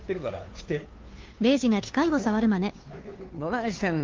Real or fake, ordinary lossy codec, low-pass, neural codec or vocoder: fake; Opus, 32 kbps; 7.2 kHz; codec, 16 kHz, 2 kbps, FunCodec, trained on Chinese and English, 25 frames a second